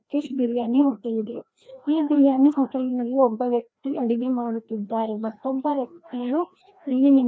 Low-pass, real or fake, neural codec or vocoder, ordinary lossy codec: none; fake; codec, 16 kHz, 1 kbps, FreqCodec, larger model; none